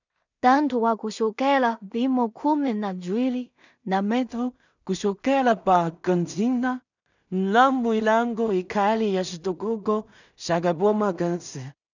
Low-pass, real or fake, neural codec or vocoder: 7.2 kHz; fake; codec, 16 kHz in and 24 kHz out, 0.4 kbps, LongCat-Audio-Codec, two codebook decoder